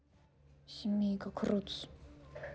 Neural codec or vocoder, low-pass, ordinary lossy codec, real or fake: none; none; none; real